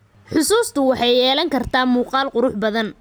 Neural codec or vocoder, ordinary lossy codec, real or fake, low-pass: none; none; real; none